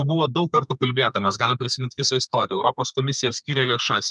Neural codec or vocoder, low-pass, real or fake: codec, 32 kHz, 1.9 kbps, SNAC; 10.8 kHz; fake